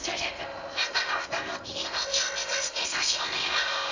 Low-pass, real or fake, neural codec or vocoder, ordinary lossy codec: 7.2 kHz; fake; codec, 16 kHz in and 24 kHz out, 0.6 kbps, FocalCodec, streaming, 2048 codes; AAC, 48 kbps